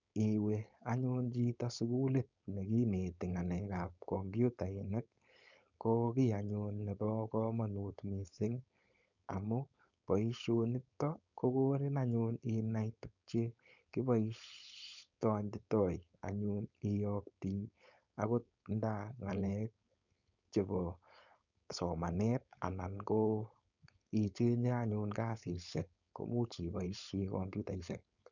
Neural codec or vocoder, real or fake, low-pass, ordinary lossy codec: codec, 16 kHz, 4.8 kbps, FACodec; fake; 7.2 kHz; none